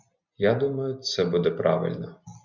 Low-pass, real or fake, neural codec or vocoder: 7.2 kHz; real; none